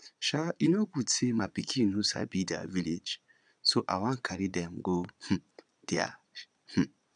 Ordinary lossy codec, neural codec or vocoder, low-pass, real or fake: none; vocoder, 22.05 kHz, 80 mel bands, Vocos; 9.9 kHz; fake